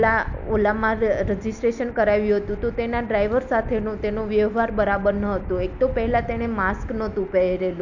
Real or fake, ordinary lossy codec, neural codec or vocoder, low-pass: real; none; none; 7.2 kHz